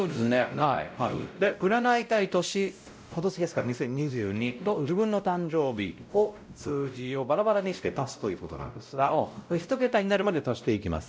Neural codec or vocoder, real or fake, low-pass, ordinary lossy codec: codec, 16 kHz, 0.5 kbps, X-Codec, WavLM features, trained on Multilingual LibriSpeech; fake; none; none